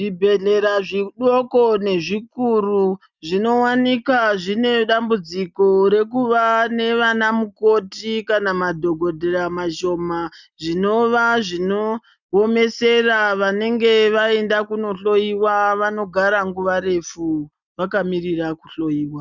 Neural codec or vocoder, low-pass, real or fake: none; 7.2 kHz; real